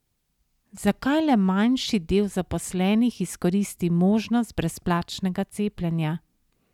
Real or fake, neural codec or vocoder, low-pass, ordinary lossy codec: real; none; 19.8 kHz; none